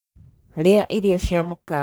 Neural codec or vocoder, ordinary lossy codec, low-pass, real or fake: codec, 44.1 kHz, 1.7 kbps, Pupu-Codec; none; none; fake